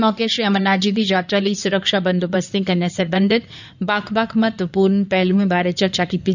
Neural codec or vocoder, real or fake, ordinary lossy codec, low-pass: codec, 16 kHz in and 24 kHz out, 2.2 kbps, FireRedTTS-2 codec; fake; none; 7.2 kHz